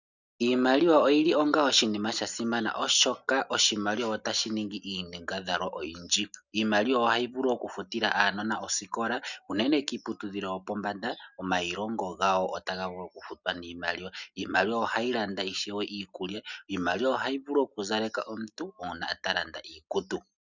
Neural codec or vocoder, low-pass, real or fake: none; 7.2 kHz; real